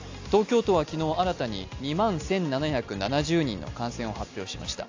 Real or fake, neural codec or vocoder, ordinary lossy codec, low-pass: real; none; AAC, 48 kbps; 7.2 kHz